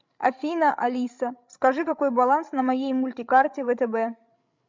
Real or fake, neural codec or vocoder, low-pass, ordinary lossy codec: fake; codec, 16 kHz, 16 kbps, FreqCodec, larger model; 7.2 kHz; MP3, 64 kbps